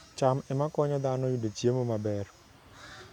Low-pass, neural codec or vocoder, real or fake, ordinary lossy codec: 19.8 kHz; none; real; none